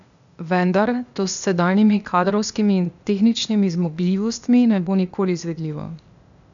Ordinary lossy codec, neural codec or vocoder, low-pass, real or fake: none; codec, 16 kHz, 0.8 kbps, ZipCodec; 7.2 kHz; fake